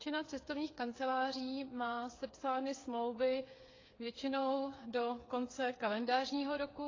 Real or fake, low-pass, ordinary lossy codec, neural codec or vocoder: fake; 7.2 kHz; AAC, 32 kbps; codec, 16 kHz, 8 kbps, FreqCodec, smaller model